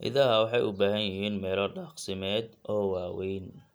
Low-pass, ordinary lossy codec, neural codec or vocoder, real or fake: none; none; none; real